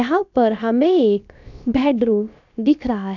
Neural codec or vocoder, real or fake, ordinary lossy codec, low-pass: codec, 16 kHz, about 1 kbps, DyCAST, with the encoder's durations; fake; none; 7.2 kHz